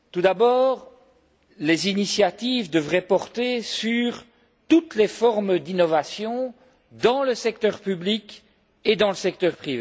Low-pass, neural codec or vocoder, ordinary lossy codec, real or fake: none; none; none; real